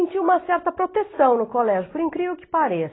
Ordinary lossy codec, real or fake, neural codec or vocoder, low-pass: AAC, 16 kbps; real; none; 7.2 kHz